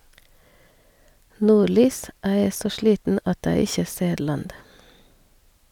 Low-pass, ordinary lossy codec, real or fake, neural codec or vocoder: 19.8 kHz; none; real; none